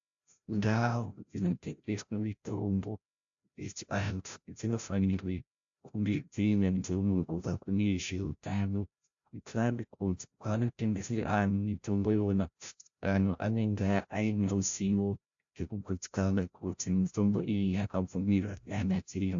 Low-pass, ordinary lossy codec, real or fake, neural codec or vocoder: 7.2 kHz; AAC, 64 kbps; fake; codec, 16 kHz, 0.5 kbps, FreqCodec, larger model